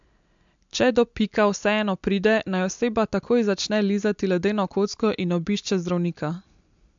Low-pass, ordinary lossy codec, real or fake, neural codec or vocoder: 7.2 kHz; MP3, 64 kbps; real; none